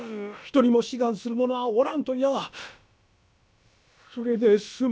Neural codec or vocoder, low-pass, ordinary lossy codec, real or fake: codec, 16 kHz, about 1 kbps, DyCAST, with the encoder's durations; none; none; fake